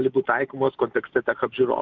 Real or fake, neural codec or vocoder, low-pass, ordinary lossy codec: real; none; 7.2 kHz; Opus, 32 kbps